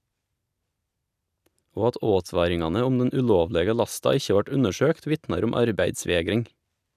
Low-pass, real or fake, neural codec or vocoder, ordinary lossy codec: 14.4 kHz; real; none; none